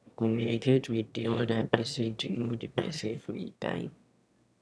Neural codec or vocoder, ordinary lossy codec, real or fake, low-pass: autoencoder, 22.05 kHz, a latent of 192 numbers a frame, VITS, trained on one speaker; none; fake; none